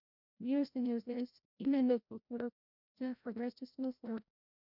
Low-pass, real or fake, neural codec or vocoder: 5.4 kHz; fake; codec, 16 kHz, 0.5 kbps, FreqCodec, larger model